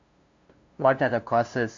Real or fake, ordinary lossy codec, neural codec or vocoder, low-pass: fake; MP3, 64 kbps; codec, 16 kHz, 0.5 kbps, FunCodec, trained on LibriTTS, 25 frames a second; 7.2 kHz